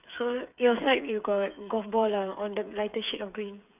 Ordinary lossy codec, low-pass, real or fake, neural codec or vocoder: none; 3.6 kHz; fake; codec, 16 kHz, 8 kbps, FreqCodec, smaller model